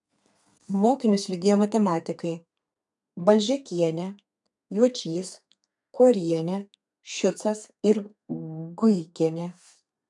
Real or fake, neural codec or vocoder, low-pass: fake; codec, 32 kHz, 1.9 kbps, SNAC; 10.8 kHz